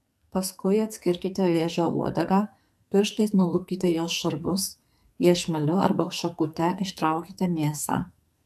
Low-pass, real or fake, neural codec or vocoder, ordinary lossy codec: 14.4 kHz; fake; codec, 44.1 kHz, 2.6 kbps, SNAC; AAC, 96 kbps